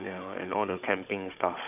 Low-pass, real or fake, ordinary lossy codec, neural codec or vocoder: 3.6 kHz; fake; none; codec, 16 kHz, 16 kbps, FunCodec, trained on Chinese and English, 50 frames a second